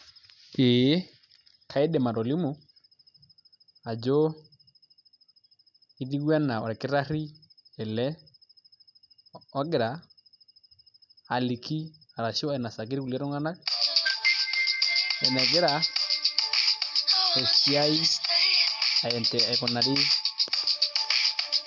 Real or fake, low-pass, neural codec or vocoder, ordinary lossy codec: real; 7.2 kHz; none; none